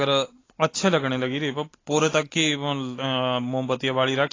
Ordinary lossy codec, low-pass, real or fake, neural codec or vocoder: AAC, 32 kbps; 7.2 kHz; real; none